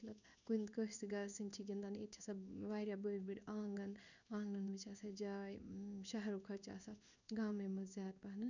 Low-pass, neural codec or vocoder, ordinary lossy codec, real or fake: 7.2 kHz; codec, 16 kHz in and 24 kHz out, 1 kbps, XY-Tokenizer; none; fake